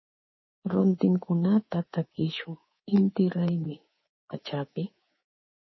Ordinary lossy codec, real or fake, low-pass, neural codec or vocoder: MP3, 24 kbps; fake; 7.2 kHz; codec, 24 kHz, 3.1 kbps, DualCodec